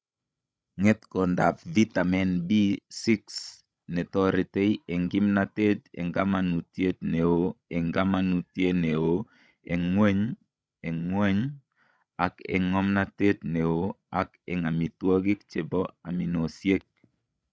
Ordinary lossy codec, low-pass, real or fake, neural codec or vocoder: none; none; fake; codec, 16 kHz, 16 kbps, FreqCodec, larger model